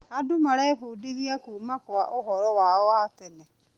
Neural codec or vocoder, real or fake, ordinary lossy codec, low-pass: none; real; Opus, 24 kbps; 19.8 kHz